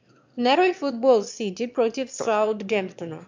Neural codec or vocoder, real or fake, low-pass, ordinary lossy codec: autoencoder, 22.05 kHz, a latent of 192 numbers a frame, VITS, trained on one speaker; fake; 7.2 kHz; MP3, 64 kbps